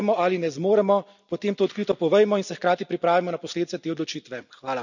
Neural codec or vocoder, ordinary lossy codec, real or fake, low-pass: none; none; real; 7.2 kHz